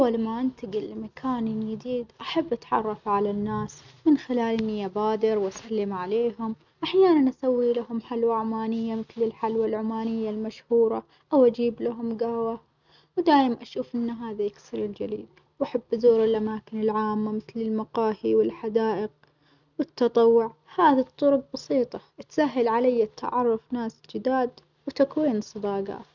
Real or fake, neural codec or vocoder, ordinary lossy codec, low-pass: real; none; none; 7.2 kHz